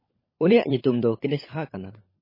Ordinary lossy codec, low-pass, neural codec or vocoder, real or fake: MP3, 24 kbps; 5.4 kHz; codec, 16 kHz, 16 kbps, FunCodec, trained on LibriTTS, 50 frames a second; fake